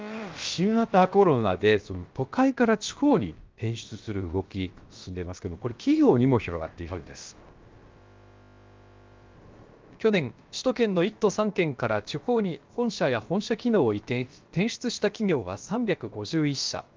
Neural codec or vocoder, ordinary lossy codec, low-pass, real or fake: codec, 16 kHz, about 1 kbps, DyCAST, with the encoder's durations; Opus, 32 kbps; 7.2 kHz; fake